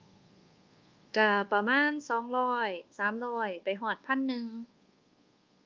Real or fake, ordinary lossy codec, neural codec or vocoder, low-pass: fake; Opus, 32 kbps; codec, 24 kHz, 1.2 kbps, DualCodec; 7.2 kHz